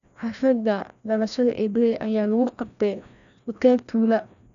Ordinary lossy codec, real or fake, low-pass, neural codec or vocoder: none; fake; 7.2 kHz; codec, 16 kHz, 1 kbps, FreqCodec, larger model